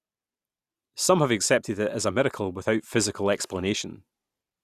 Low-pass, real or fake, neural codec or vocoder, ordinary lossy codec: 14.4 kHz; real; none; Opus, 64 kbps